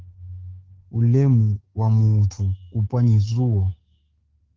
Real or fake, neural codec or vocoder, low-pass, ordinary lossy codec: fake; codec, 44.1 kHz, 7.8 kbps, DAC; 7.2 kHz; Opus, 16 kbps